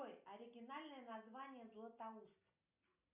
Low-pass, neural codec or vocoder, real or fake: 3.6 kHz; none; real